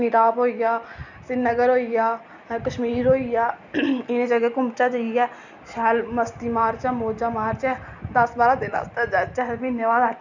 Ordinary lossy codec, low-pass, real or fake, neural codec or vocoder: none; 7.2 kHz; real; none